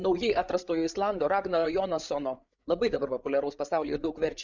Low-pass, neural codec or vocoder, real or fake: 7.2 kHz; codec, 16 kHz, 8 kbps, FreqCodec, larger model; fake